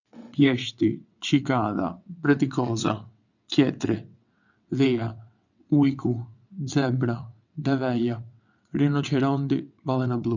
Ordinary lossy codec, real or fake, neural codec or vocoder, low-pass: none; fake; vocoder, 22.05 kHz, 80 mel bands, WaveNeXt; 7.2 kHz